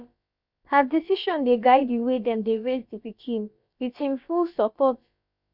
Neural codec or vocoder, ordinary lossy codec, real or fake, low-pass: codec, 16 kHz, about 1 kbps, DyCAST, with the encoder's durations; none; fake; 5.4 kHz